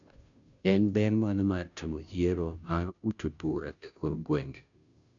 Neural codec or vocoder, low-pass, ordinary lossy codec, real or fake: codec, 16 kHz, 0.5 kbps, FunCodec, trained on Chinese and English, 25 frames a second; 7.2 kHz; none; fake